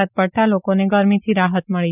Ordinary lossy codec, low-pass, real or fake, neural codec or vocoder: none; 3.6 kHz; real; none